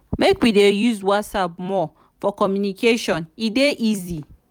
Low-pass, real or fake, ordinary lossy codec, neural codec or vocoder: 19.8 kHz; fake; none; vocoder, 44.1 kHz, 128 mel bands every 256 samples, BigVGAN v2